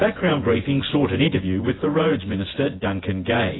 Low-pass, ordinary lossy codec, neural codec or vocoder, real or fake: 7.2 kHz; AAC, 16 kbps; vocoder, 24 kHz, 100 mel bands, Vocos; fake